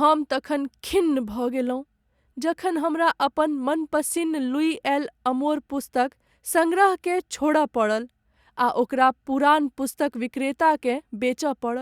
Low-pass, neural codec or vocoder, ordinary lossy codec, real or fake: 19.8 kHz; none; Opus, 64 kbps; real